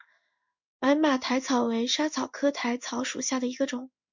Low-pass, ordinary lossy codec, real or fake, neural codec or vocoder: 7.2 kHz; MP3, 64 kbps; fake; codec, 16 kHz in and 24 kHz out, 1 kbps, XY-Tokenizer